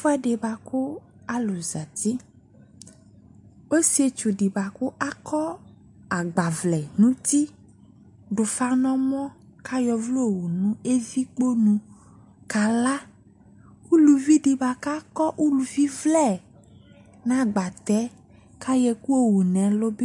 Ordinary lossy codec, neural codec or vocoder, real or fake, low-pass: MP3, 64 kbps; none; real; 10.8 kHz